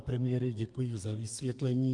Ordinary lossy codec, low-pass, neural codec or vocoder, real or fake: Opus, 32 kbps; 10.8 kHz; codec, 32 kHz, 1.9 kbps, SNAC; fake